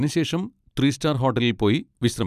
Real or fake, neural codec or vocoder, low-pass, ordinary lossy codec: real; none; 14.4 kHz; none